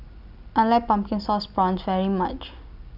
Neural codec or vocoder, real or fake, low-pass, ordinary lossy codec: none; real; 5.4 kHz; none